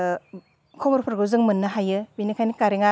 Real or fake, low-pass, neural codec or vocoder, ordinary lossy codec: real; none; none; none